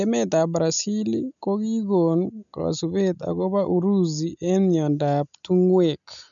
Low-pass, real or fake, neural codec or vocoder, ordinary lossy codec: 7.2 kHz; real; none; none